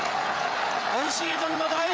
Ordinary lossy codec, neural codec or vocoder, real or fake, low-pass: none; codec, 16 kHz, 8 kbps, FreqCodec, larger model; fake; none